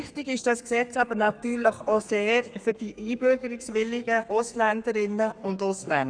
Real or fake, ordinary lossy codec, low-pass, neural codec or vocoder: fake; none; 9.9 kHz; codec, 32 kHz, 1.9 kbps, SNAC